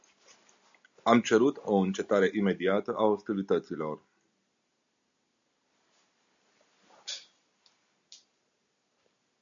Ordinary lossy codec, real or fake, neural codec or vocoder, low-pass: MP3, 64 kbps; real; none; 7.2 kHz